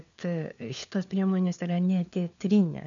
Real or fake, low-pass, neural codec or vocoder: fake; 7.2 kHz; codec, 16 kHz, 4 kbps, FunCodec, trained on LibriTTS, 50 frames a second